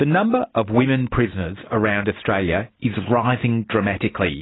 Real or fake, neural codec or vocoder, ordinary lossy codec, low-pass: real; none; AAC, 16 kbps; 7.2 kHz